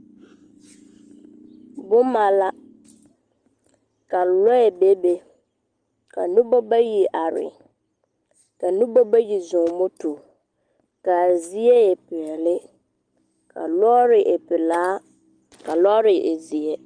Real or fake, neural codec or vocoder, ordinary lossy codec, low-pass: real; none; Opus, 32 kbps; 9.9 kHz